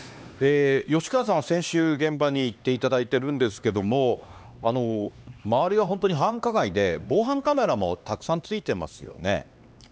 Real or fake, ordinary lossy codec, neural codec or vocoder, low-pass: fake; none; codec, 16 kHz, 2 kbps, X-Codec, HuBERT features, trained on LibriSpeech; none